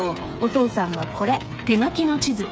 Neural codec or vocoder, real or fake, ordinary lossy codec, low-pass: codec, 16 kHz, 8 kbps, FreqCodec, smaller model; fake; none; none